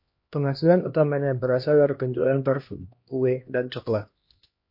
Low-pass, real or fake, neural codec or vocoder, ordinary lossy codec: 5.4 kHz; fake; codec, 16 kHz, 1 kbps, X-Codec, HuBERT features, trained on LibriSpeech; MP3, 32 kbps